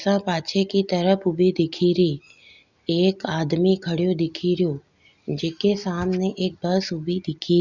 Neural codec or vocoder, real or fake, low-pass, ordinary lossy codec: none; real; 7.2 kHz; Opus, 64 kbps